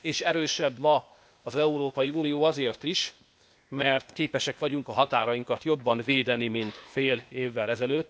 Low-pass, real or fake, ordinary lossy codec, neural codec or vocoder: none; fake; none; codec, 16 kHz, 0.8 kbps, ZipCodec